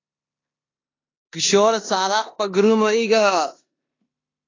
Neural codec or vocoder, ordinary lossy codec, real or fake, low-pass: codec, 16 kHz in and 24 kHz out, 0.9 kbps, LongCat-Audio-Codec, four codebook decoder; AAC, 32 kbps; fake; 7.2 kHz